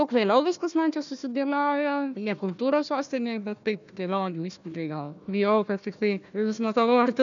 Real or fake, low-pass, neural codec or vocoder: fake; 7.2 kHz; codec, 16 kHz, 1 kbps, FunCodec, trained on Chinese and English, 50 frames a second